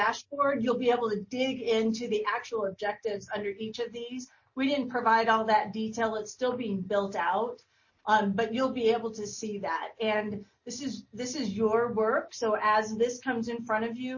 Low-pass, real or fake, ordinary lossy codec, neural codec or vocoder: 7.2 kHz; real; MP3, 32 kbps; none